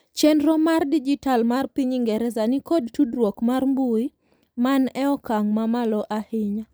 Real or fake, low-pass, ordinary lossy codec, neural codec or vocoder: real; none; none; none